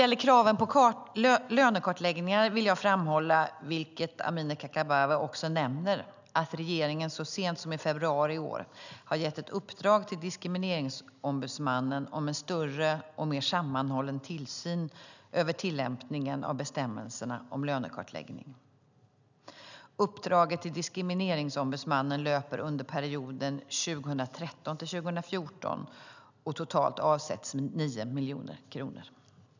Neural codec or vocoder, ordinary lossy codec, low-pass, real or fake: none; none; 7.2 kHz; real